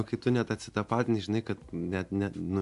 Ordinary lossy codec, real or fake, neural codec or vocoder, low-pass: AAC, 64 kbps; real; none; 10.8 kHz